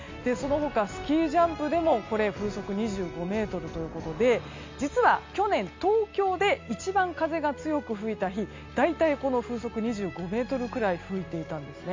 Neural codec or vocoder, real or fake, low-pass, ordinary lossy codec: none; real; 7.2 kHz; MP3, 64 kbps